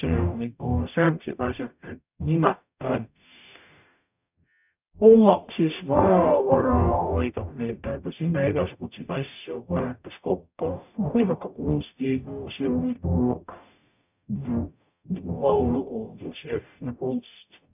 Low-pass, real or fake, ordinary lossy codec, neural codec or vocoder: 3.6 kHz; fake; none; codec, 44.1 kHz, 0.9 kbps, DAC